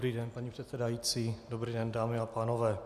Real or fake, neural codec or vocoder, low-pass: real; none; 14.4 kHz